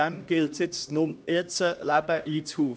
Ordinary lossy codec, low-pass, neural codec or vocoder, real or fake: none; none; codec, 16 kHz, 1 kbps, X-Codec, HuBERT features, trained on LibriSpeech; fake